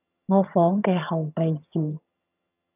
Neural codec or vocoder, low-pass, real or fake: vocoder, 22.05 kHz, 80 mel bands, HiFi-GAN; 3.6 kHz; fake